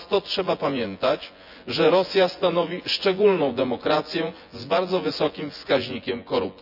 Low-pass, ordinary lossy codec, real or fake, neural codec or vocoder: 5.4 kHz; none; fake; vocoder, 24 kHz, 100 mel bands, Vocos